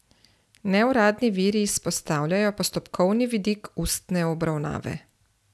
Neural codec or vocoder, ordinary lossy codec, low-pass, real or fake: none; none; none; real